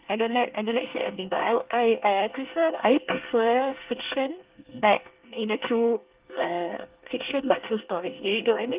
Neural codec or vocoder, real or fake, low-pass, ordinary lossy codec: codec, 24 kHz, 1 kbps, SNAC; fake; 3.6 kHz; Opus, 32 kbps